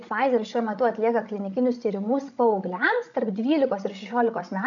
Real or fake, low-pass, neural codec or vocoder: fake; 7.2 kHz; codec, 16 kHz, 16 kbps, FreqCodec, larger model